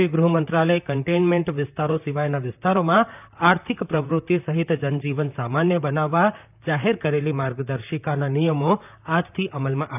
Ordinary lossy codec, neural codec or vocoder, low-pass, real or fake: none; vocoder, 44.1 kHz, 128 mel bands, Pupu-Vocoder; 3.6 kHz; fake